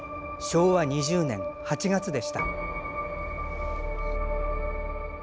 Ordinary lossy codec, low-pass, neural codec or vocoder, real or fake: none; none; none; real